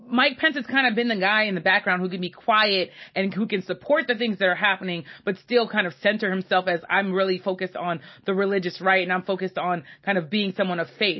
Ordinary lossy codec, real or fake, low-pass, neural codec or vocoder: MP3, 24 kbps; real; 7.2 kHz; none